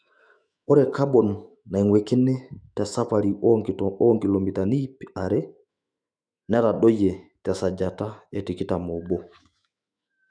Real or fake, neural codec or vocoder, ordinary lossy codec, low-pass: fake; autoencoder, 48 kHz, 128 numbers a frame, DAC-VAE, trained on Japanese speech; none; 9.9 kHz